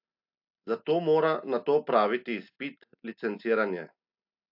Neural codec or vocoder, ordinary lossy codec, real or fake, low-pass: none; AAC, 48 kbps; real; 5.4 kHz